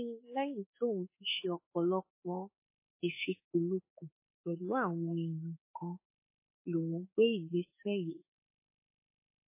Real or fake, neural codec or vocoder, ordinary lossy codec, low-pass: fake; autoencoder, 48 kHz, 32 numbers a frame, DAC-VAE, trained on Japanese speech; MP3, 16 kbps; 3.6 kHz